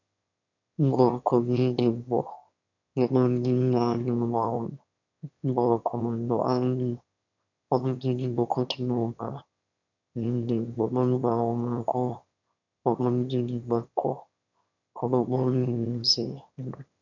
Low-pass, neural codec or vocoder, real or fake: 7.2 kHz; autoencoder, 22.05 kHz, a latent of 192 numbers a frame, VITS, trained on one speaker; fake